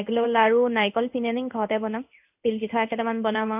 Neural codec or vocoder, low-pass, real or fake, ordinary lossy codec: codec, 16 kHz in and 24 kHz out, 1 kbps, XY-Tokenizer; 3.6 kHz; fake; none